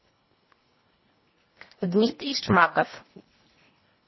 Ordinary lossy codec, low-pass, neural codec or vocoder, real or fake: MP3, 24 kbps; 7.2 kHz; codec, 24 kHz, 1.5 kbps, HILCodec; fake